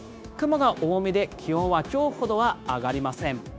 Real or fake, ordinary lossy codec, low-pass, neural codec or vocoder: fake; none; none; codec, 16 kHz, 0.9 kbps, LongCat-Audio-Codec